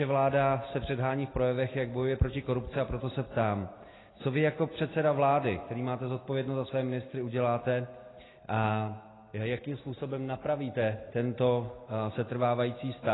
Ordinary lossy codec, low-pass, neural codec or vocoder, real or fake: AAC, 16 kbps; 7.2 kHz; none; real